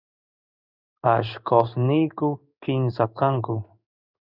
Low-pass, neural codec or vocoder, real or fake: 5.4 kHz; codec, 16 kHz in and 24 kHz out, 1 kbps, XY-Tokenizer; fake